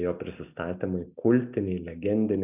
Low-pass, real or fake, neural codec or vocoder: 3.6 kHz; real; none